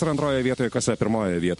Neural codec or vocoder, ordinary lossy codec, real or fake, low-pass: none; MP3, 48 kbps; real; 14.4 kHz